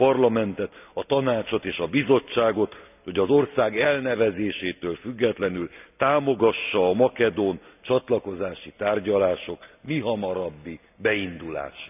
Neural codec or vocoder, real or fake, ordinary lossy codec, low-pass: none; real; none; 3.6 kHz